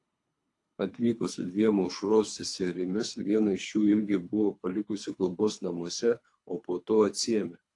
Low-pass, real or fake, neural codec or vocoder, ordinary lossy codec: 10.8 kHz; fake; codec, 24 kHz, 3 kbps, HILCodec; AAC, 48 kbps